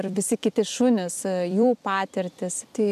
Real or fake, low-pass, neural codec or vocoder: fake; 14.4 kHz; vocoder, 44.1 kHz, 128 mel bands every 256 samples, BigVGAN v2